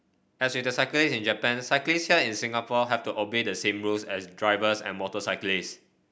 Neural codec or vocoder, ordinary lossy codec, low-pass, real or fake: none; none; none; real